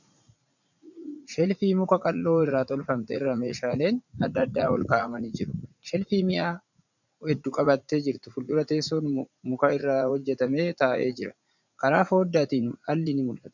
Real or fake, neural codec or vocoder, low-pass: fake; vocoder, 44.1 kHz, 80 mel bands, Vocos; 7.2 kHz